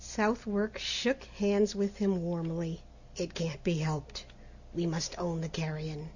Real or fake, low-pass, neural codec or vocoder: real; 7.2 kHz; none